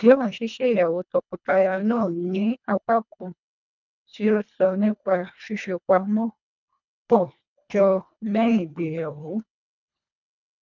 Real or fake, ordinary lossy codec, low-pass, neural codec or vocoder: fake; none; 7.2 kHz; codec, 24 kHz, 1.5 kbps, HILCodec